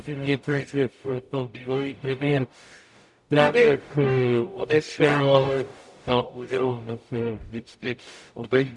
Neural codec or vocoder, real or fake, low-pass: codec, 44.1 kHz, 0.9 kbps, DAC; fake; 10.8 kHz